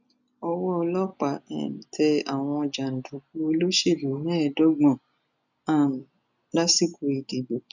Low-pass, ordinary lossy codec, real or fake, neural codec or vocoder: 7.2 kHz; none; real; none